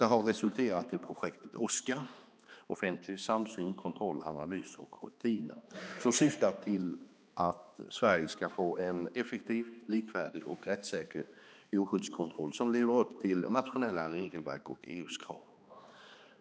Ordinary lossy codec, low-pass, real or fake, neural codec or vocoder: none; none; fake; codec, 16 kHz, 2 kbps, X-Codec, HuBERT features, trained on balanced general audio